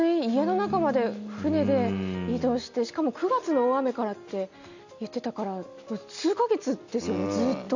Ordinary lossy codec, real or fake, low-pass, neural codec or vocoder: none; real; 7.2 kHz; none